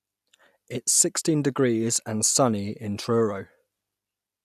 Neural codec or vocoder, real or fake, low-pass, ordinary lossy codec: none; real; 14.4 kHz; none